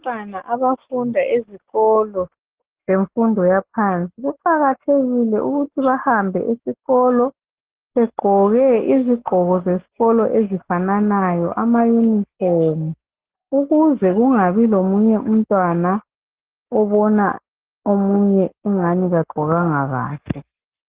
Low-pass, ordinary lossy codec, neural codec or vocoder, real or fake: 3.6 kHz; Opus, 16 kbps; none; real